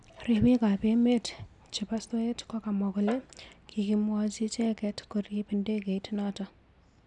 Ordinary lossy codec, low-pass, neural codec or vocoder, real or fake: none; 10.8 kHz; none; real